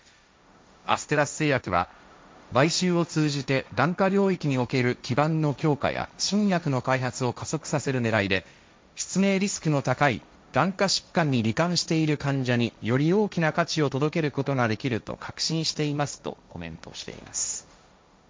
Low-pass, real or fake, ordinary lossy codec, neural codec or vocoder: none; fake; none; codec, 16 kHz, 1.1 kbps, Voila-Tokenizer